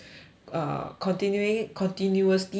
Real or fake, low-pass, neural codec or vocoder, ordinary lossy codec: real; none; none; none